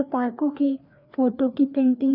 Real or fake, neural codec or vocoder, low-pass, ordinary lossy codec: fake; codec, 16 kHz, 2 kbps, FreqCodec, larger model; 5.4 kHz; none